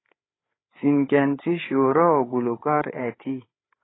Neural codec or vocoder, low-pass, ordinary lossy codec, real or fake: codec, 16 kHz, 8 kbps, FreqCodec, larger model; 7.2 kHz; AAC, 16 kbps; fake